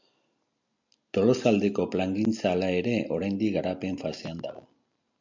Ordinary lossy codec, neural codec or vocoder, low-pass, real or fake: MP3, 64 kbps; none; 7.2 kHz; real